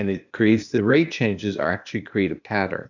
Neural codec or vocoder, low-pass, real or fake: codec, 16 kHz, 0.8 kbps, ZipCodec; 7.2 kHz; fake